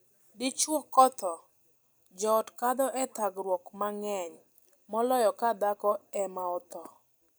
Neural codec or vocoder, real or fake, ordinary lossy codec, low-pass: vocoder, 44.1 kHz, 128 mel bands every 256 samples, BigVGAN v2; fake; none; none